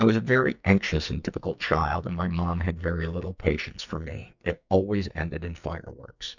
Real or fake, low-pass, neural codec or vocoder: fake; 7.2 kHz; codec, 44.1 kHz, 2.6 kbps, SNAC